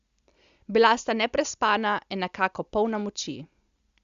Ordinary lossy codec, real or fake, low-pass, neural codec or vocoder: Opus, 64 kbps; real; 7.2 kHz; none